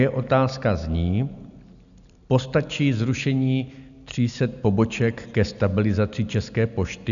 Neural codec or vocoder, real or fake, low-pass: none; real; 7.2 kHz